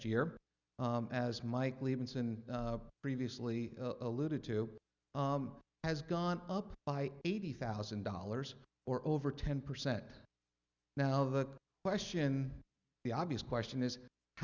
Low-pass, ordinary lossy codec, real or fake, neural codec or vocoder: 7.2 kHz; Opus, 64 kbps; real; none